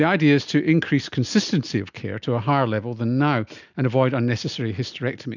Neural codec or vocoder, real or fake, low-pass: none; real; 7.2 kHz